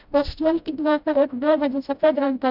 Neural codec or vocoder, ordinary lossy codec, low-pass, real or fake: codec, 16 kHz, 0.5 kbps, FreqCodec, smaller model; none; 5.4 kHz; fake